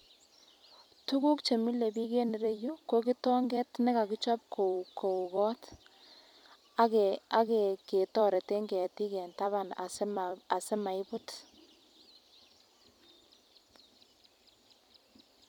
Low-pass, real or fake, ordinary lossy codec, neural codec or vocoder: 19.8 kHz; fake; none; vocoder, 44.1 kHz, 128 mel bands every 256 samples, BigVGAN v2